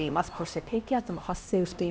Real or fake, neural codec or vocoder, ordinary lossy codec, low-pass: fake; codec, 16 kHz, 1 kbps, X-Codec, HuBERT features, trained on LibriSpeech; none; none